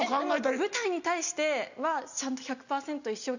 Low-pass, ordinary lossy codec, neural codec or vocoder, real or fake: 7.2 kHz; none; none; real